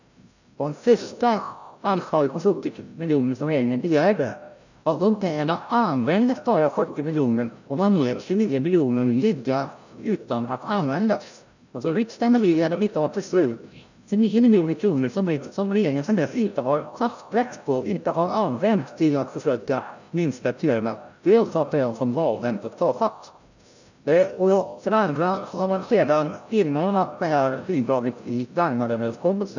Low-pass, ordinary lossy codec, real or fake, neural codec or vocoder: 7.2 kHz; none; fake; codec, 16 kHz, 0.5 kbps, FreqCodec, larger model